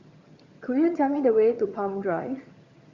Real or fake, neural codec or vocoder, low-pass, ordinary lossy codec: fake; vocoder, 22.05 kHz, 80 mel bands, HiFi-GAN; 7.2 kHz; Opus, 64 kbps